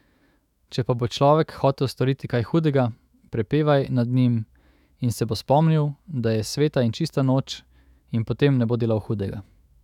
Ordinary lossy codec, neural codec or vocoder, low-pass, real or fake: none; autoencoder, 48 kHz, 128 numbers a frame, DAC-VAE, trained on Japanese speech; 19.8 kHz; fake